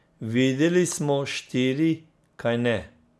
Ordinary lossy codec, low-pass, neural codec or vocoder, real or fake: none; none; none; real